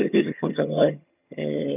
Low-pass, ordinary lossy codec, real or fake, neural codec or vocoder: 3.6 kHz; none; fake; vocoder, 22.05 kHz, 80 mel bands, HiFi-GAN